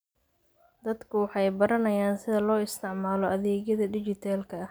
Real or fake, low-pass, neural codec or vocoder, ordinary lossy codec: real; none; none; none